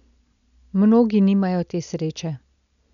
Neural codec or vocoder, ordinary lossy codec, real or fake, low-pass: none; MP3, 96 kbps; real; 7.2 kHz